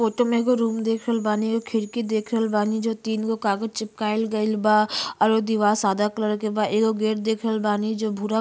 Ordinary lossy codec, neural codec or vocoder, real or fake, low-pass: none; none; real; none